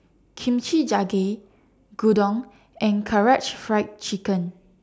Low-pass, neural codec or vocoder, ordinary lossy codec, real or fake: none; none; none; real